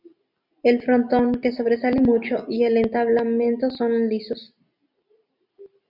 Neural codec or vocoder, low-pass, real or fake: none; 5.4 kHz; real